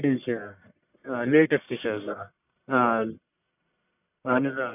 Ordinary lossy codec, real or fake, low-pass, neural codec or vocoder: none; fake; 3.6 kHz; codec, 44.1 kHz, 1.7 kbps, Pupu-Codec